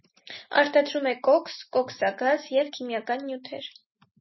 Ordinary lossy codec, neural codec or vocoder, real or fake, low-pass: MP3, 24 kbps; none; real; 7.2 kHz